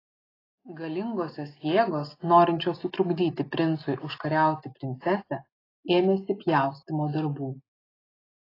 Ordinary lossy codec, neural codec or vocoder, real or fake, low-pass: AAC, 24 kbps; none; real; 5.4 kHz